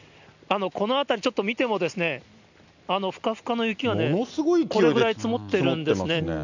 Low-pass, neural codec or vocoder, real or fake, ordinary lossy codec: 7.2 kHz; none; real; none